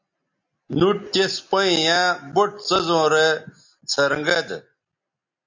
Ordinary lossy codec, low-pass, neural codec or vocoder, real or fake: MP3, 48 kbps; 7.2 kHz; none; real